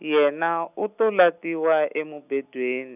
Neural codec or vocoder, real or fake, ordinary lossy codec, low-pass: none; real; none; 3.6 kHz